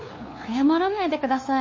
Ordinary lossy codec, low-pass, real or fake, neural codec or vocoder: MP3, 32 kbps; 7.2 kHz; fake; codec, 16 kHz, 2 kbps, FunCodec, trained on LibriTTS, 25 frames a second